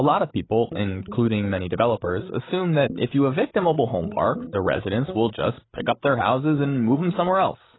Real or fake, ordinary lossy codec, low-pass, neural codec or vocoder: fake; AAC, 16 kbps; 7.2 kHz; codec, 16 kHz, 8 kbps, FreqCodec, larger model